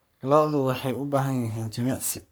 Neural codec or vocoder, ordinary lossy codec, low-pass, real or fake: codec, 44.1 kHz, 3.4 kbps, Pupu-Codec; none; none; fake